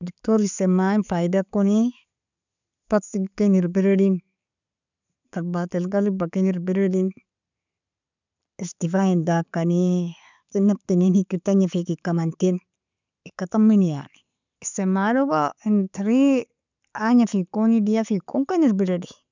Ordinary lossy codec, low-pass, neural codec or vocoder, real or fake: none; 7.2 kHz; none; real